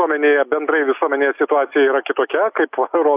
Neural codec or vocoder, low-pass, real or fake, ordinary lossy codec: none; 3.6 kHz; real; AAC, 32 kbps